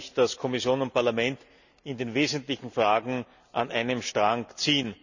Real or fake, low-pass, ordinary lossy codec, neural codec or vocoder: real; 7.2 kHz; none; none